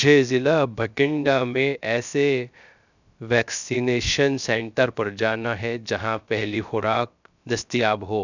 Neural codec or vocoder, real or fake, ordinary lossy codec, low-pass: codec, 16 kHz, 0.3 kbps, FocalCodec; fake; none; 7.2 kHz